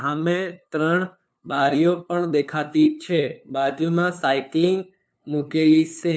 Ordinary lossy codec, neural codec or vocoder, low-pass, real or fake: none; codec, 16 kHz, 2 kbps, FunCodec, trained on LibriTTS, 25 frames a second; none; fake